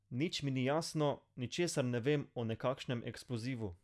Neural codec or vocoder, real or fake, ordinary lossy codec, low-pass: none; real; none; none